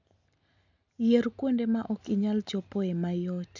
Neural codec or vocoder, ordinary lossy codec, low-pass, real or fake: none; none; 7.2 kHz; real